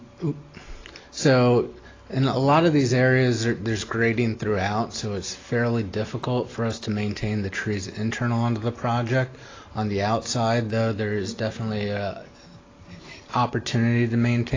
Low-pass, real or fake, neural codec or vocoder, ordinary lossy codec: 7.2 kHz; real; none; AAC, 32 kbps